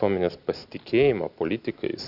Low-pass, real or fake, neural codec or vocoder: 5.4 kHz; real; none